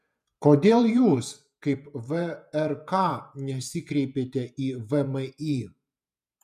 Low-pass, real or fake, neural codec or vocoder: 14.4 kHz; fake; vocoder, 48 kHz, 128 mel bands, Vocos